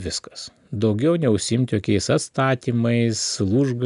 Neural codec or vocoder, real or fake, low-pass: none; real; 10.8 kHz